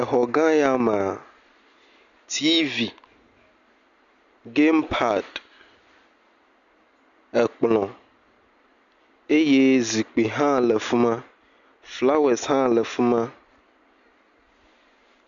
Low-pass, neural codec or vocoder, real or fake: 7.2 kHz; none; real